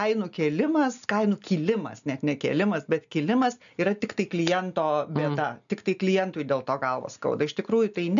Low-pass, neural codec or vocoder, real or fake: 7.2 kHz; none; real